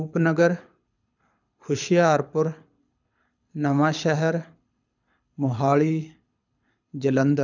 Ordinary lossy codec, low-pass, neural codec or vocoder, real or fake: none; 7.2 kHz; codec, 24 kHz, 6 kbps, HILCodec; fake